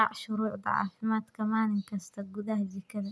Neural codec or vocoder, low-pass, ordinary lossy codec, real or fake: none; none; none; real